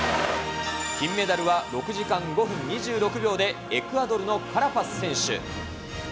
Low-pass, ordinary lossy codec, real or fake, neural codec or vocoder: none; none; real; none